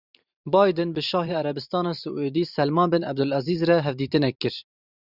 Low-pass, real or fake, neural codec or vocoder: 5.4 kHz; fake; vocoder, 44.1 kHz, 128 mel bands every 512 samples, BigVGAN v2